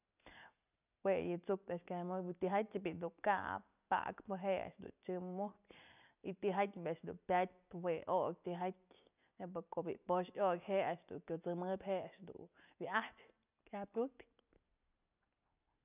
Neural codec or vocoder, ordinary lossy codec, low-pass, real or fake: none; none; 3.6 kHz; real